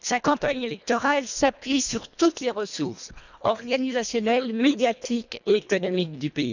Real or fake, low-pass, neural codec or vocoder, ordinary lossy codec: fake; 7.2 kHz; codec, 24 kHz, 1.5 kbps, HILCodec; none